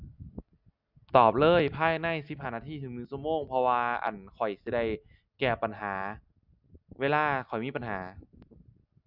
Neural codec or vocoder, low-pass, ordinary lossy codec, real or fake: none; 5.4 kHz; none; real